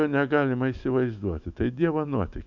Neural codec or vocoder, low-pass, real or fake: autoencoder, 48 kHz, 128 numbers a frame, DAC-VAE, trained on Japanese speech; 7.2 kHz; fake